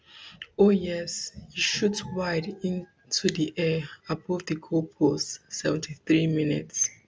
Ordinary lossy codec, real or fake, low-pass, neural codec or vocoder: none; real; none; none